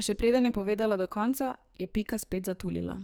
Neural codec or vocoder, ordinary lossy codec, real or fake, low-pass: codec, 44.1 kHz, 2.6 kbps, SNAC; none; fake; none